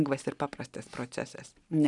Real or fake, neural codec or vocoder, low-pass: real; none; 10.8 kHz